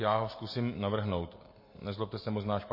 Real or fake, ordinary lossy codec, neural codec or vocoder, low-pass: real; MP3, 24 kbps; none; 5.4 kHz